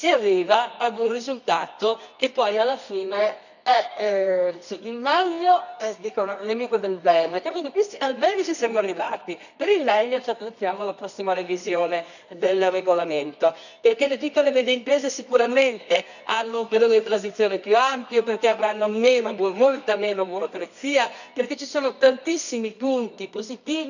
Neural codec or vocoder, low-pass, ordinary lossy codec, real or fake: codec, 24 kHz, 0.9 kbps, WavTokenizer, medium music audio release; 7.2 kHz; none; fake